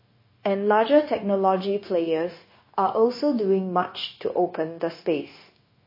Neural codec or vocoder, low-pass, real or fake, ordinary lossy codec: codec, 16 kHz, 0.9 kbps, LongCat-Audio-Codec; 5.4 kHz; fake; MP3, 24 kbps